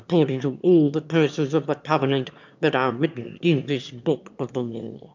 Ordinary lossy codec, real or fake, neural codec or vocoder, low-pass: MP3, 64 kbps; fake; autoencoder, 22.05 kHz, a latent of 192 numbers a frame, VITS, trained on one speaker; 7.2 kHz